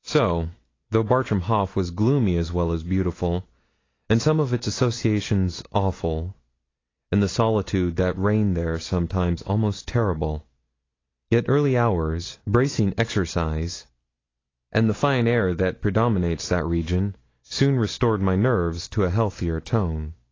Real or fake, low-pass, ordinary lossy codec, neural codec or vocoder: real; 7.2 kHz; AAC, 32 kbps; none